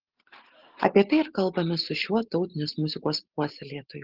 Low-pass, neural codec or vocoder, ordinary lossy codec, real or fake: 5.4 kHz; none; Opus, 16 kbps; real